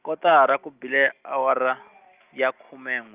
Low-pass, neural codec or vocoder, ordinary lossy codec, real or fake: 3.6 kHz; none; Opus, 24 kbps; real